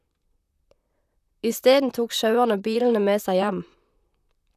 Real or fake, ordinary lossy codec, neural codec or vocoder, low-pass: fake; none; vocoder, 44.1 kHz, 128 mel bands, Pupu-Vocoder; 14.4 kHz